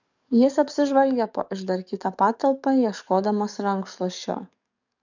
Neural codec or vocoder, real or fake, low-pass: codec, 44.1 kHz, 7.8 kbps, DAC; fake; 7.2 kHz